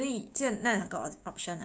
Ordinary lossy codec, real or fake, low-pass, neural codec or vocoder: none; fake; none; codec, 16 kHz, 6 kbps, DAC